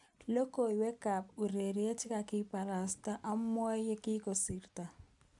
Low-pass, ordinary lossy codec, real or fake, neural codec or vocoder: 10.8 kHz; none; real; none